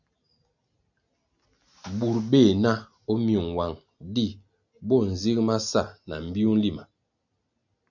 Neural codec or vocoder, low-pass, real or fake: none; 7.2 kHz; real